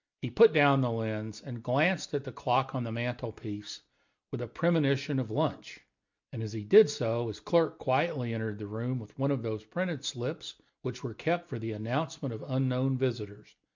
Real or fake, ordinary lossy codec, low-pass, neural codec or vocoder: real; MP3, 64 kbps; 7.2 kHz; none